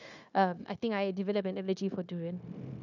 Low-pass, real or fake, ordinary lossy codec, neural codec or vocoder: 7.2 kHz; fake; none; codec, 16 kHz, 0.9 kbps, LongCat-Audio-Codec